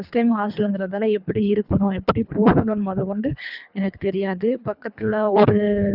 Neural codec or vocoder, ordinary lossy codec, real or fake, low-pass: codec, 24 kHz, 3 kbps, HILCodec; none; fake; 5.4 kHz